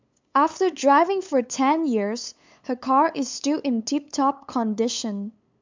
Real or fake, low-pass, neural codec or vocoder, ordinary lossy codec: fake; 7.2 kHz; codec, 16 kHz, 8 kbps, FunCodec, trained on LibriTTS, 25 frames a second; MP3, 64 kbps